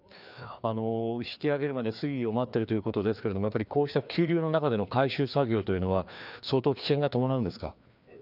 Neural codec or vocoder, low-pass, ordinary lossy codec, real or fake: codec, 16 kHz, 2 kbps, FreqCodec, larger model; 5.4 kHz; none; fake